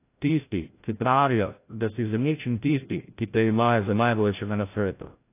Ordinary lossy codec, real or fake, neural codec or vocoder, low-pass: AAC, 24 kbps; fake; codec, 16 kHz, 0.5 kbps, FreqCodec, larger model; 3.6 kHz